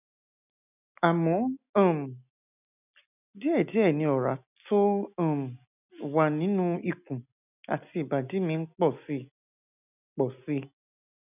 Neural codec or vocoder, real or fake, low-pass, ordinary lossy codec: none; real; 3.6 kHz; none